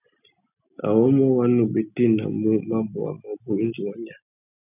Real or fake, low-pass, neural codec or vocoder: real; 3.6 kHz; none